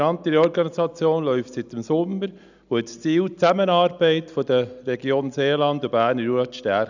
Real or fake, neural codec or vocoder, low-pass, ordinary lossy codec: real; none; 7.2 kHz; none